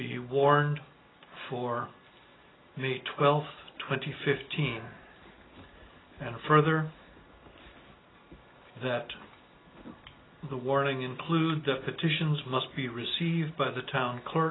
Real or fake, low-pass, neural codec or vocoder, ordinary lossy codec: real; 7.2 kHz; none; AAC, 16 kbps